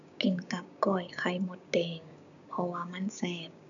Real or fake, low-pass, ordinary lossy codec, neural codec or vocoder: fake; 7.2 kHz; none; codec, 16 kHz, 6 kbps, DAC